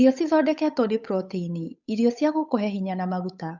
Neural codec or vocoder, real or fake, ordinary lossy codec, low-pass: codec, 16 kHz, 8 kbps, FunCodec, trained on Chinese and English, 25 frames a second; fake; none; 7.2 kHz